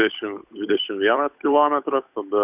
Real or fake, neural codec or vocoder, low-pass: fake; codec, 16 kHz, 8 kbps, FunCodec, trained on Chinese and English, 25 frames a second; 3.6 kHz